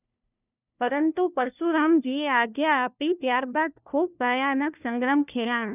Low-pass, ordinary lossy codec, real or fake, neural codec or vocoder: 3.6 kHz; none; fake; codec, 16 kHz, 1 kbps, FunCodec, trained on LibriTTS, 50 frames a second